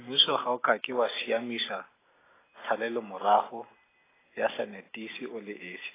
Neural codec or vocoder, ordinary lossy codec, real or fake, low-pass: none; AAC, 16 kbps; real; 3.6 kHz